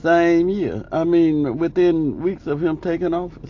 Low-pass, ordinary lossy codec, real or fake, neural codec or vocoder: 7.2 kHz; AAC, 48 kbps; real; none